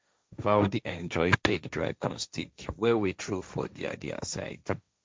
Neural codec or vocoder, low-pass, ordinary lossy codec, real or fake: codec, 16 kHz, 1.1 kbps, Voila-Tokenizer; none; none; fake